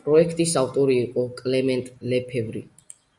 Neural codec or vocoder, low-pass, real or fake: none; 10.8 kHz; real